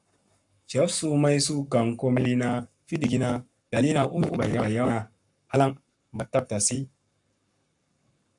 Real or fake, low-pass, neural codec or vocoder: fake; 10.8 kHz; codec, 44.1 kHz, 7.8 kbps, Pupu-Codec